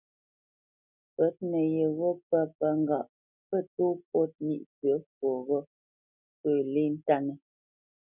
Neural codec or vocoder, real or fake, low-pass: none; real; 3.6 kHz